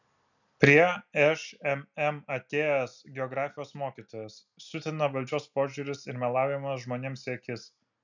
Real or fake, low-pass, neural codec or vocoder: real; 7.2 kHz; none